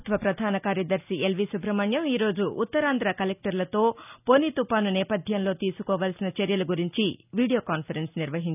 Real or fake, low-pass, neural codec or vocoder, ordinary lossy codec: real; 3.6 kHz; none; none